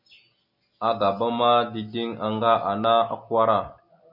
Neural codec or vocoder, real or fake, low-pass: none; real; 5.4 kHz